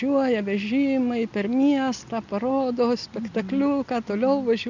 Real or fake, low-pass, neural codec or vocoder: real; 7.2 kHz; none